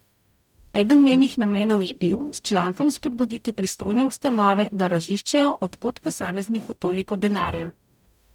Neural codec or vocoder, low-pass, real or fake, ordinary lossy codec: codec, 44.1 kHz, 0.9 kbps, DAC; 19.8 kHz; fake; none